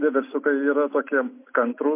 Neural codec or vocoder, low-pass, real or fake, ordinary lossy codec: none; 3.6 kHz; real; MP3, 24 kbps